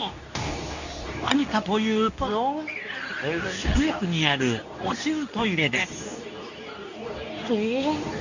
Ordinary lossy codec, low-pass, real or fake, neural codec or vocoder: none; 7.2 kHz; fake; codec, 24 kHz, 0.9 kbps, WavTokenizer, medium speech release version 2